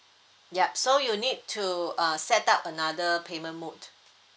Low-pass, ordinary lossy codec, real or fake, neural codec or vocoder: none; none; real; none